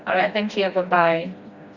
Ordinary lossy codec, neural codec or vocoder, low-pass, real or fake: Opus, 64 kbps; codec, 16 kHz, 1 kbps, FreqCodec, smaller model; 7.2 kHz; fake